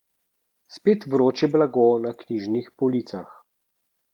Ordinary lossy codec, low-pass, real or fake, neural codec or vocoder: Opus, 24 kbps; 19.8 kHz; real; none